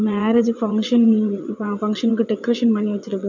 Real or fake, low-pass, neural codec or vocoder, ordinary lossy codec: fake; 7.2 kHz; vocoder, 22.05 kHz, 80 mel bands, WaveNeXt; none